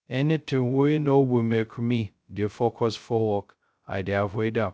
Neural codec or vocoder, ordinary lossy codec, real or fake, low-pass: codec, 16 kHz, 0.2 kbps, FocalCodec; none; fake; none